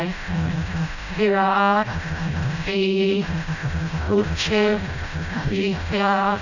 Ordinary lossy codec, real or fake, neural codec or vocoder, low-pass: none; fake; codec, 16 kHz, 0.5 kbps, FreqCodec, smaller model; 7.2 kHz